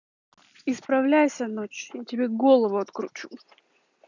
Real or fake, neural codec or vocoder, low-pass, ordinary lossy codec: real; none; 7.2 kHz; none